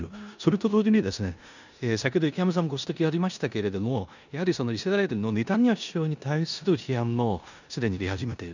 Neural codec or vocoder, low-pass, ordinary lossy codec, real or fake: codec, 16 kHz in and 24 kHz out, 0.9 kbps, LongCat-Audio-Codec, four codebook decoder; 7.2 kHz; none; fake